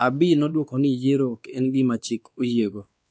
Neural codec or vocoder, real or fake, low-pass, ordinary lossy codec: codec, 16 kHz, 2 kbps, X-Codec, WavLM features, trained on Multilingual LibriSpeech; fake; none; none